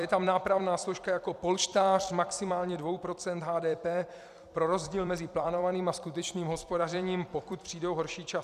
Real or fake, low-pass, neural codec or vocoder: fake; 14.4 kHz; vocoder, 44.1 kHz, 128 mel bands every 256 samples, BigVGAN v2